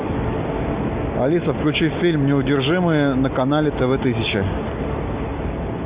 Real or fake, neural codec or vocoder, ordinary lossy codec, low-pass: real; none; Opus, 64 kbps; 3.6 kHz